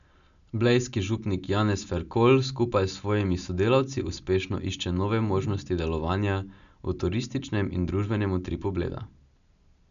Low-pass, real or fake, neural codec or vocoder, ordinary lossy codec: 7.2 kHz; real; none; none